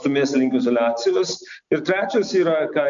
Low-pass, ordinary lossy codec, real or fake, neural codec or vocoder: 7.2 kHz; MP3, 64 kbps; real; none